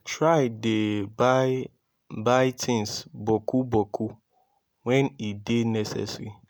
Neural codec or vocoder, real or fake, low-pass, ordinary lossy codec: none; real; none; none